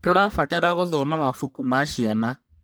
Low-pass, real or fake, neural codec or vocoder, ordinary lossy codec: none; fake; codec, 44.1 kHz, 1.7 kbps, Pupu-Codec; none